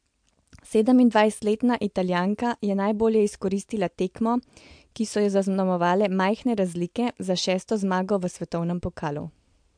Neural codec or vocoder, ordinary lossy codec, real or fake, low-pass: none; MP3, 64 kbps; real; 9.9 kHz